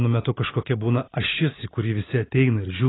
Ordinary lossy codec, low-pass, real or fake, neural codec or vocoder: AAC, 16 kbps; 7.2 kHz; real; none